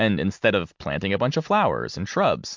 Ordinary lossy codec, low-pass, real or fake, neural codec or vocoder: MP3, 64 kbps; 7.2 kHz; real; none